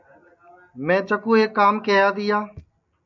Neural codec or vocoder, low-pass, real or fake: none; 7.2 kHz; real